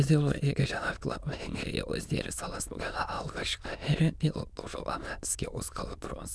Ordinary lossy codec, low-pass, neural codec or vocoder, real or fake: none; none; autoencoder, 22.05 kHz, a latent of 192 numbers a frame, VITS, trained on many speakers; fake